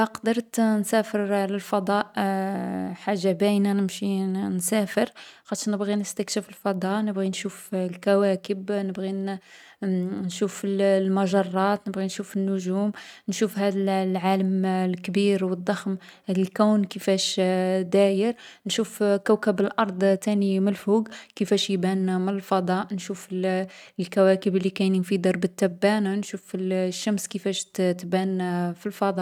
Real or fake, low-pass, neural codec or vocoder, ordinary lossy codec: real; 19.8 kHz; none; none